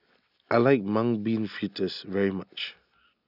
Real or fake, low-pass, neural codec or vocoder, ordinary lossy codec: real; 5.4 kHz; none; none